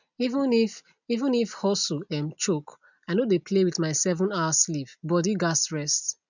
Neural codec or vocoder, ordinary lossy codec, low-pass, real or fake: none; none; 7.2 kHz; real